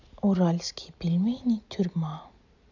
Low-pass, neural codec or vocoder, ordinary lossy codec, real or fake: 7.2 kHz; none; none; real